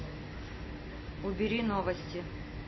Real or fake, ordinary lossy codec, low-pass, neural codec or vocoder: real; MP3, 24 kbps; 7.2 kHz; none